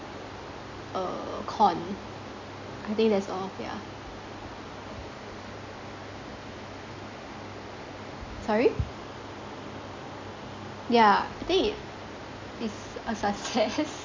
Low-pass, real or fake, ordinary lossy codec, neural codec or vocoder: 7.2 kHz; real; MP3, 64 kbps; none